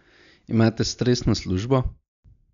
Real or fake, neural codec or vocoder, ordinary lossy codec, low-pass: real; none; none; 7.2 kHz